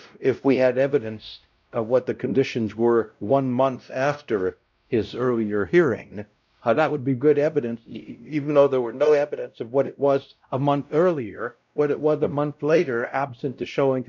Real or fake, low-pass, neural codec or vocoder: fake; 7.2 kHz; codec, 16 kHz, 0.5 kbps, X-Codec, WavLM features, trained on Multilingual LibriSpeech